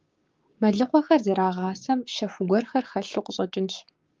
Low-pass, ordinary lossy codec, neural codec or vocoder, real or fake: 7.2 kHz; Opus, 32 kbps; codec, 16 kHz, 6 kbps, DAC; fake